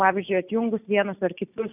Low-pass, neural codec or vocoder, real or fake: 3.6 kHz; none; real